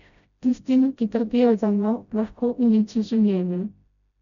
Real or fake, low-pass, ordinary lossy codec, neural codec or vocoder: fake; 7.2 kHz; none; codec, 16 kHz, 0.5 kbps, FreqCodec, smaller model